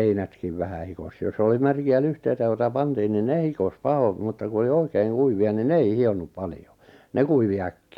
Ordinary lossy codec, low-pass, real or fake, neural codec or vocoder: none; 19.8 kHz; real; none